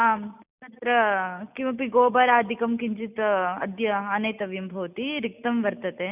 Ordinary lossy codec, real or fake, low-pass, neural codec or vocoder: none; real; 3.6 kHz; none